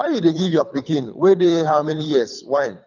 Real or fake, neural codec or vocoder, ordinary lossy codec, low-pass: fake; codec, 24 kHz, 3 kbps, HILCodec; none; 7.2 kHz